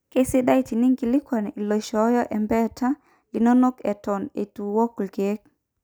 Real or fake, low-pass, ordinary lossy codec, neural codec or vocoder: real; none; none; none